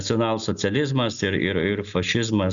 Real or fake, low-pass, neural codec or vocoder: real; 7.2 kHz; none